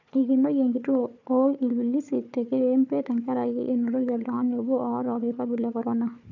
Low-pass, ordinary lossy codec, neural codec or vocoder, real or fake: 7.2 kHz; none; codec, 16 kHz, 4 kbps, FunCodec, trained on Chinese and English, 50 frames a second; fake